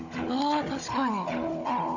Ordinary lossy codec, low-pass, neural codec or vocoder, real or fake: none; 7.2 kHz; codec, 16 kHz, 16 kbps, FunCodec, trained on Chinese and English, 50 frames a second; fake